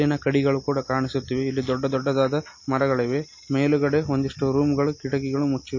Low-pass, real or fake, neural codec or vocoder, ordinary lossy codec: 7.2 kHz; real; none; MP3, 32 kbps